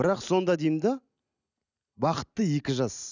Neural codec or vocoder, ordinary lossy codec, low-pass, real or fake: none; none; 7.2 kHz; real